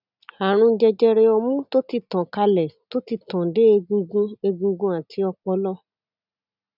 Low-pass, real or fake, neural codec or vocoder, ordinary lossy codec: 5.4 kHz; real; none; none